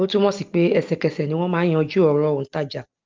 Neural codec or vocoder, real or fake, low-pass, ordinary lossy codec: none; real; 7.2 kHz; Opus, 24 kbps